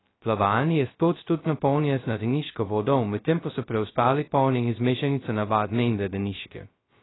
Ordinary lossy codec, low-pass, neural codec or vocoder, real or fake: AAC, 16 kbps; 7.2 kHz; codec, 16 kHz, 0.2 kbps, FocalCodec; fake